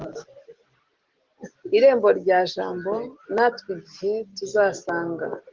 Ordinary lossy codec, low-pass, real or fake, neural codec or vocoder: Opus, 16 kbps; 7.2 kHz; real; none